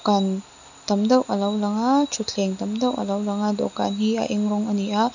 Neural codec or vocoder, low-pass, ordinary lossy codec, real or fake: none; 7.2 kHz; none; real